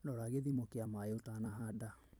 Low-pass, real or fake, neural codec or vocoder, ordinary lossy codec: none; fake; vocoder, 44.1 kHz, 128 mel bands every 256 samples, BigVGAN v2; none